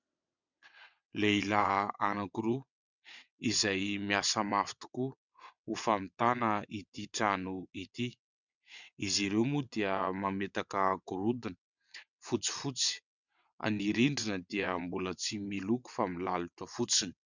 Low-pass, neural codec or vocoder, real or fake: 7.2 kHz; vocoder, 22.05 kHz, 80 mel bands, WaveNeXt; fake